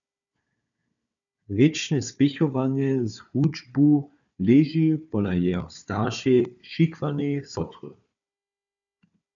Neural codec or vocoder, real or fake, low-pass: codec, 16 kHz, 4 kbps, FunCodec, trained on Chinese and English, 50 frames a second; fake; 7.2 kHz